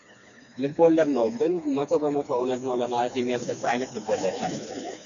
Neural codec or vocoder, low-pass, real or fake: codec, 16 kHz, 2 kbps, FreqCodec, smaller model; 7.2 kHz; fake